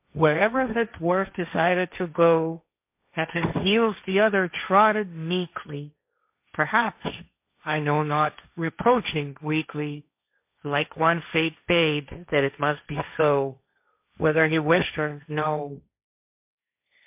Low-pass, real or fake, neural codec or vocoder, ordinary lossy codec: 3.6 kHz; fake; codec, 16 kHz, 1.1 kbps, Voila-Tokenizer; MP3, 32 kbps